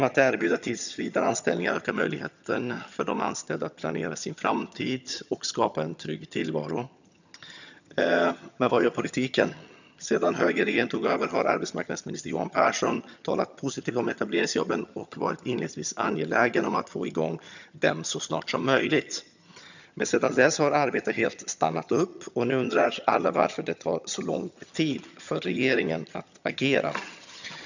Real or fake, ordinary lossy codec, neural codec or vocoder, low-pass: fake; none; vocoder, 22.05 kHz, 80 mel bands, HiFi-GAN; 7.2 kHz